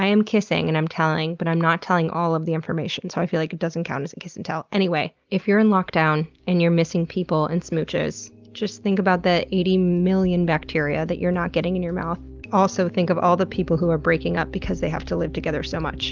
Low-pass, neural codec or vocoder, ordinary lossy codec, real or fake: 7.2 kHz; none; Opus, 32 kbps; real